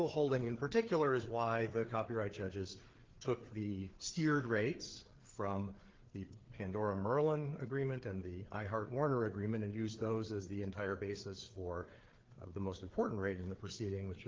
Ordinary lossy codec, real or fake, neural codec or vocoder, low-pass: Opus, 16 kbps; fake; codec, 16 kHz, 4 kbps, FreqCodec, larger model; 7.2 kHz